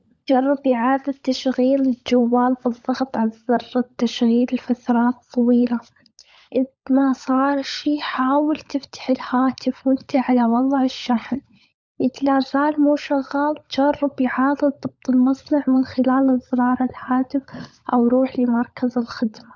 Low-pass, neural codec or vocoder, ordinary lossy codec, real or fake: none; codec, 16 kHz, 16 kbps, FunCodec, trained on LibriTTS, 50 frames a second; none; fake